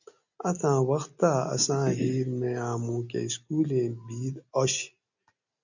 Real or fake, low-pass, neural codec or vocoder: real; 7.2 kHz; none